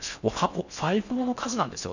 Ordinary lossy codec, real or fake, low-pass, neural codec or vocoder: none; fake; 7.2 kHz; codec, 16 kHz in and 24 kHz out, 0.6 kbps, FocalCodec, streaming, 4096 codes